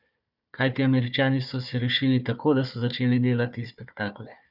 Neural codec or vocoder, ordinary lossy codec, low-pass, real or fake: codec, 16 kHz, 4 kbps, FunCodec, trained on Chinese and English, 50 frames a second; none; 5.4 kHz; fake